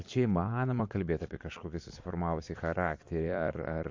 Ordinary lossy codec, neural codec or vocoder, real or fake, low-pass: MP3, 48 kbps; vocoder, 22.05 kHz, 80 mel bands, Vocos; fake; 7.2 kHz